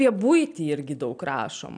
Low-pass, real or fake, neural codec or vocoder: 9.9 kHz; real; none